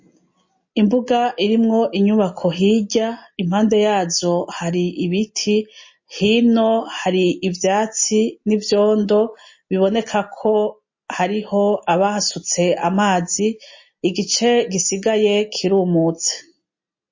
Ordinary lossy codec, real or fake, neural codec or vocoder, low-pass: MP3, 32 kbps; real; none; 7.2 kHz